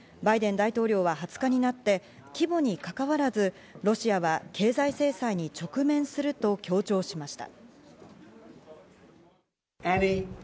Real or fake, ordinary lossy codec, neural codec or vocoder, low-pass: real; none; none; none